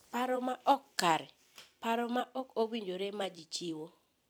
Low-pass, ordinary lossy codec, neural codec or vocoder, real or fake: none; none; vocoder, 44.1 kHz, 128 mel bands every 256 samples, BigVGAN v2; fake